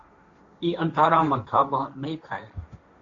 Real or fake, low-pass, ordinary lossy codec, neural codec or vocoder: fake; 7.2 kHz; MP3, 48 kbps; codec, 16 kHz, 1.1 kbps, Voila-Tokenizer